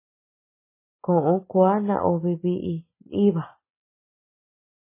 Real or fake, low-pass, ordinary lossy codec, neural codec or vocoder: real; 3.6 kHz; MP3, 16 kbps; none